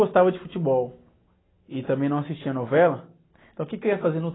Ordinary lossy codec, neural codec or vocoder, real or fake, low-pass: AAC, 16 kbps; none; real; 7.2 kHz